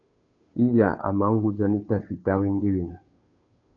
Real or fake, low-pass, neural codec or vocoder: fake; 7.2 kHz; codec, 16 kHz, 2 kbps, FunCodec, trained on Chinese and English, 25 frames a second